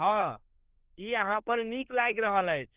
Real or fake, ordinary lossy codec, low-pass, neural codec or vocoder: fake; Opus, 16 kbps; 3.6 kHz; codec, 24 kHz, 1 kbps, SNAC